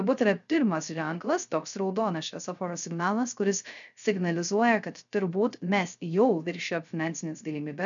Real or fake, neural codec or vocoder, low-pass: fake; codec, 16 kHz, 0.3 kbps, FocalCodec; 7.2 kHz